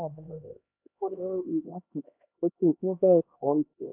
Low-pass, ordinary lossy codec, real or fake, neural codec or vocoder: 3.6 kHz; AAC, 32 kbps; fake; codec, 16 kHz, 1 kbps, X-Codec, HuBERT features, trained on LibriSpeech